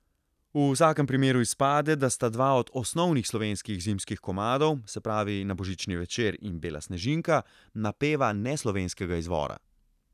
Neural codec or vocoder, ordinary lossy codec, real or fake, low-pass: none; none; real; 14.4 kHz